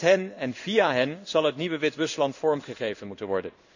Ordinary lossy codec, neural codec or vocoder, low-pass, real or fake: none; codec, 16 kHz in and 24 kHz out, 1 kbps, XY-Tokenizer; 7.2 kHz; fake